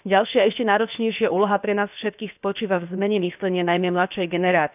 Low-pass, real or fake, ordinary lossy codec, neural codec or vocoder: 3.6 kHz; fake; none; codec, 16 kHz, about 1 kbps, DyCAST, with the encoder's durations